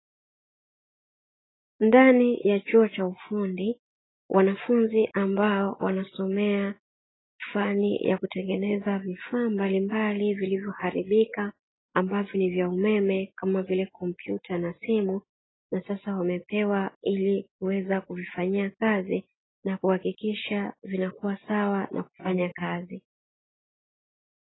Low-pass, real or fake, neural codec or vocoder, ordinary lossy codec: 7.2 kHz; real; none; AAC, 16 kbps